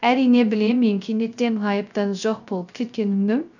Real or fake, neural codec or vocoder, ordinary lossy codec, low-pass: fake; codec, 16 kHz, 0.3 kbps, FocalCodec; none; 7.2 kHz